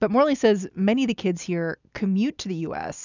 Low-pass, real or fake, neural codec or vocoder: 7.2 kHz; real; none